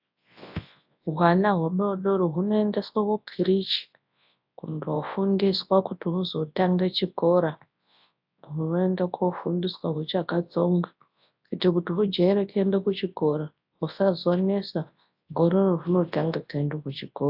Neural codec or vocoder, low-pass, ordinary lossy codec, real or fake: codec, 24 kHz, 0.9 kbps, WavTokenizer, large speech release; 5.4 kHz; AAC, 48 kbps; fake